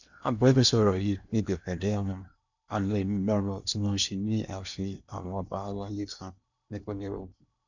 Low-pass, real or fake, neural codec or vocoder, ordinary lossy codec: 7.2 kHz; fake; codec, 16 kHz in and 24 kHz out, 0.8 kbps, FocalCodec, streaming, 65536 codes; none